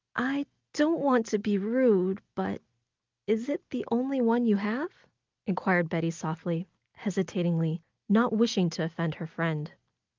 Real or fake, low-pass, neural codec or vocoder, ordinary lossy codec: real; 7.2 kHz; none; Opus, 24 kbps